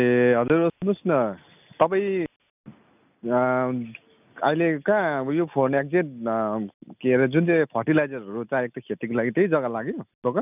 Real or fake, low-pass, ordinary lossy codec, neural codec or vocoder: real; 3.6 kHz; none; none